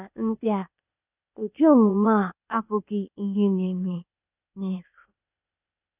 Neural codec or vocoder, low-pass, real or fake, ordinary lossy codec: codec, 16 kHz, 0.8 kbps, ZipCodec; 3.6 kHz; fake; none